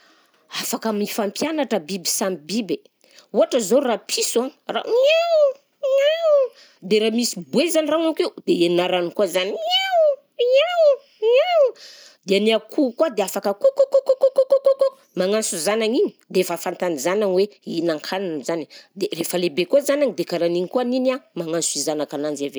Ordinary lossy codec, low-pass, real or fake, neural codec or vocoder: none; none; real; none